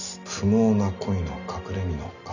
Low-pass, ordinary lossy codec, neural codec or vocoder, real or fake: 7.2 kHz; none; none; real